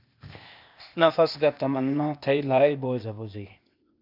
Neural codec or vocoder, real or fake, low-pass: codec, 16 kHz, 0.8 kbps, ZipCodec; fake; 5.4 kHz